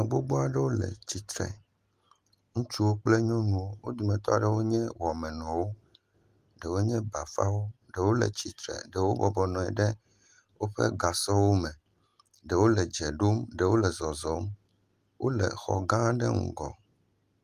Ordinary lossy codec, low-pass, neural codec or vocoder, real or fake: Opus, 24 kbps; 14.4 kHz; none; real